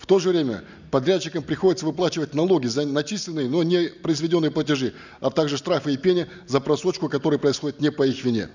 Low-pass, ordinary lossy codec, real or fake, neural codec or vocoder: 7.2 kHz; none; real; none